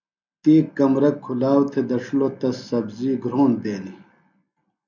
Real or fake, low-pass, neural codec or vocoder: real; 7.2 kHz; none